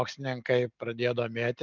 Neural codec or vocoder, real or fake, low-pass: none; real; 7.2 kHz